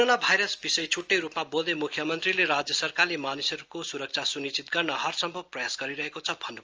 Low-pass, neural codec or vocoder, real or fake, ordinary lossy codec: 7.2 kHz; none; real; Opus, 24 kbps